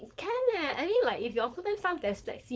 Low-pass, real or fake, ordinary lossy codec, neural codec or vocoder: none; fake; none; codec, 16 kHz, 4.8 kbps, FACodec